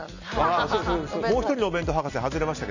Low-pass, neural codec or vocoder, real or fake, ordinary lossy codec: 7.2 kHz; none; real; none